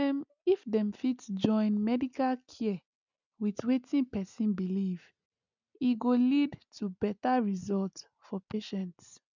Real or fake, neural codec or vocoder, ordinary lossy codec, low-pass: real; none; none; 7.2 kHz